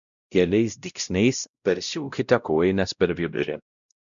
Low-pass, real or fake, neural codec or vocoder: 7.2 kHz; fake; codec, 16 kHz, 0.5 kbps, X-Codec, WavLM features, trained on Multilingual LibriSpeech